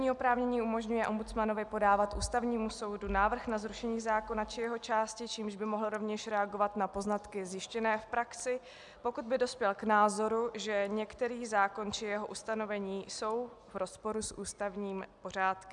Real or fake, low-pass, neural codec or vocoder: real; 10.8 kHz; none